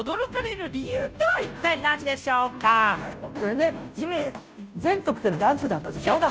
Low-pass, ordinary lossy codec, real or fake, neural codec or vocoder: none; none; fake; codec, 16 kHz, 0.5 kbps, FunCodec, trained on Chinese and English, 25 frames a second